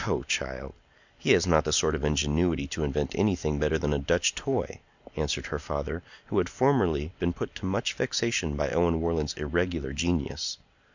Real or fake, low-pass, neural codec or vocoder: real; 7.2 kHz; none